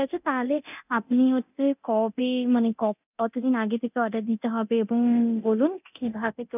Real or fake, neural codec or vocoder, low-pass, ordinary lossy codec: fake; codec, 24 kHz, 0.9 kbps, DualCodec; 3.6 kHz; none